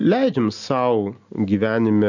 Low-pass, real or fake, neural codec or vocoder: 7.2 kHz; real; none